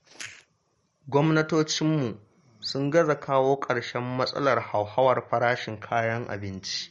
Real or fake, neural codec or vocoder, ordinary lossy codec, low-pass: real; none; MP3, 48 kbps; 19.8 kHz